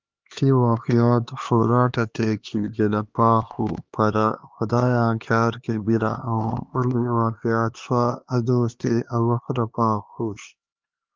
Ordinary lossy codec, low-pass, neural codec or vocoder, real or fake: Opus, 32 kbps; 7.2 kHz; codec, 16 kHz, 2 kbps, X-Codec, HuBERT features, trained on LibriSpeech; fake